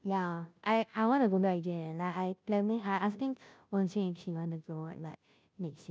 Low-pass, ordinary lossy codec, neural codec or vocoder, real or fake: none; none; codec, 16 kHz, 0.5 kbps, FunCodec, trained on Chinese and English, 25 frames a second; fake